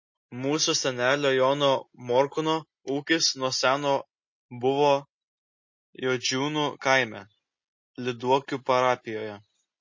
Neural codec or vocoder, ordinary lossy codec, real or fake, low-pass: none; MP3, 32 kbps; real; 7.2 kHz